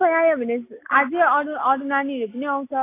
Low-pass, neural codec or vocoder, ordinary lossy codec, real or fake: 3.6 kHz; none; AAC, 24 kbps; real